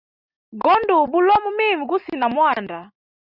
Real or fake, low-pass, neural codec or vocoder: real; 5.4 kHz; none